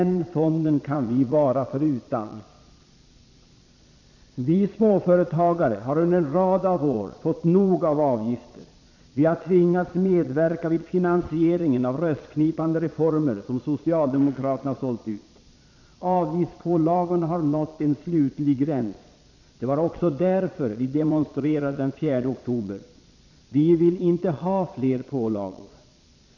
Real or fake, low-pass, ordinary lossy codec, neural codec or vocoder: fake; 7.2 kHz; none; vocoder, 22.05 kHz, 80 mel bands, Vocos